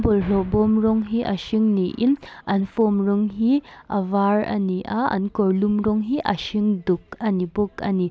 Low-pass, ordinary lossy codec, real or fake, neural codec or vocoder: none; none; real; none